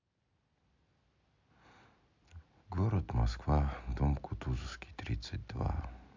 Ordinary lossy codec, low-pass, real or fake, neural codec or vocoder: MP3, 64 kbps; 7.2 kHz; real; none